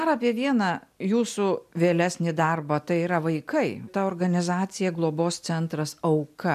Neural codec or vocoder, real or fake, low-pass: none; real; 14.4 kHz